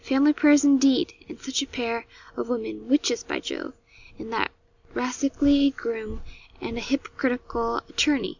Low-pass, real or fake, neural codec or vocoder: 7.2 kHz; real; none